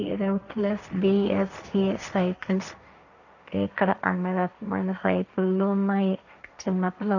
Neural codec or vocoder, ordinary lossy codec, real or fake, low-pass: codec, 16 kHz, 1.1 kbps, Voila-Tokenizer; none; fake; 7.2 kHz